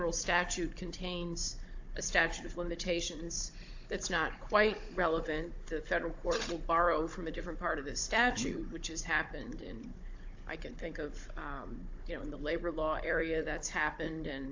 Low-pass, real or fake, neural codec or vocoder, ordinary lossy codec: 7.2 kHz; fake; codec, 16 kHz, 16 kbps, FunCodec, trained on Chinese and English, 50 frames a second; AAC, 48 kbps